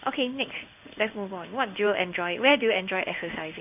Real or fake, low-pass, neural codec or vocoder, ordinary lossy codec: fake; 3.6 kHz; codec, 16 kHz in and 24 kHz out, 1 kbps, XY-Tokenizer; none